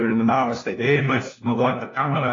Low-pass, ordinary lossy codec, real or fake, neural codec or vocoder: 7.2 kHz; AAC, 32 kbps; fake; codec, 16 kHz, 1 kbps, FunCodec, trained on LibriTTS, 50 frames a second